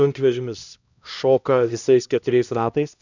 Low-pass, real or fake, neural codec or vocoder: 7.2 kHz; fake; codec, 16 kHz, 1 kbps, X-Codec, HuBERT features, trained on LibriSpeech